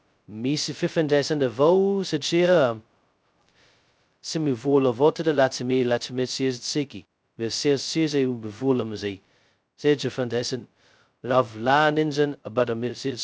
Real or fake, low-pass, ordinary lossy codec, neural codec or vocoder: fake; none; none; codec, 16 kHz, 0.2 kbps, FocalCodec